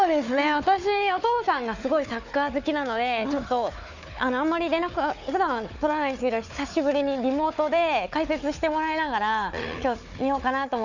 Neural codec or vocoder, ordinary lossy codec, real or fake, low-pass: codec, 16 kHz, 4 kbps, FunCodec, trained on Chinese and English, 50 frames a second; none; fake; 7.2 kHz